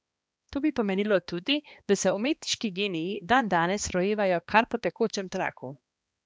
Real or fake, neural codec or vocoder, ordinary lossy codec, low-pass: fake; codec, 16 kHz, 2 kbps, X-Codec, HuBERT features, trained on balanced general audio; none; none